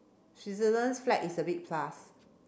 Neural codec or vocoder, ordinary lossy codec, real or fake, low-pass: none; none; real; none